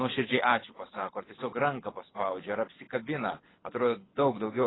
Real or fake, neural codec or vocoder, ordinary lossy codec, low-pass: fake; codec, 24 kHz, 6 kbps, HILCodec; AAC, 16 kbps; 7.2 kHz